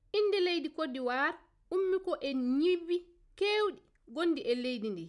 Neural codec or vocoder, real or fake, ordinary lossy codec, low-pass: none; real; none; 10.8 kHz